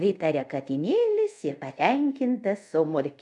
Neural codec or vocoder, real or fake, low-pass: codec, 24 kHz, 0.5 kbps, DualCodec; fake; 10.8 kHz